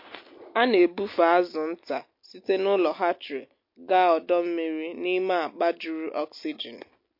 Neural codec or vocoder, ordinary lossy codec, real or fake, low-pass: none; MP3, 32 kbps; real; 5.4 kHz